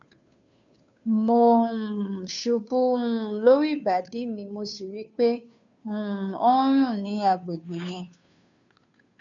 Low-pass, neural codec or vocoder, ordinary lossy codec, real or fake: 7.2 kHz; codec, 16 kHz, 2 kbps, FunCodec, trained on Chinese and English, 25 frames a second; none; fake